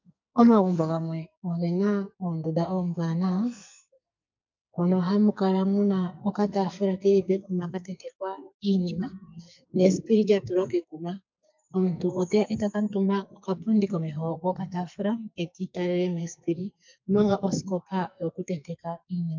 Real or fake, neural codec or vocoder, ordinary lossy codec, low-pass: fake; codec, 44.1 kHz, 2.6 kbps, SNAC; MP3, 64 kbps; 7.2 kHz